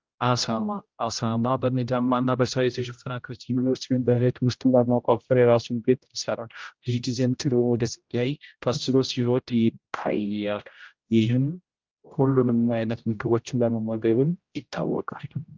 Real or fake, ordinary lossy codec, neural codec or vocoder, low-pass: fake; Opus, 32 kbps; codec, 16 kHz, 0.5 kbps, X-Codec, HuBERT features, trained on general audio; 7.2 kHz